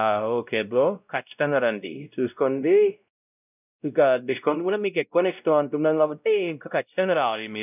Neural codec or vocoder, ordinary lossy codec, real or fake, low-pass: codec, 16 kHz, 0.5 kbps, X-Codec, WavLM features, trained on Multilingual LibriSpeech; none; fake; 3.6 kHz